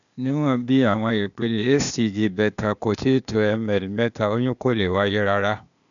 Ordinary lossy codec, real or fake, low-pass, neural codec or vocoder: none; fake; 7.2 kHz; codec, 16 kHz, 0.8 kbps, ZipCodec